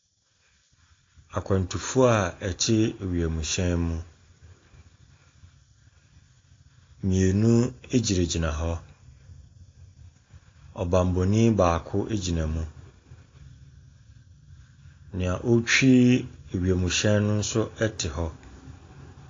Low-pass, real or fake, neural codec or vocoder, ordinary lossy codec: 7.2 kHz; real; none; AAC, 32 kbps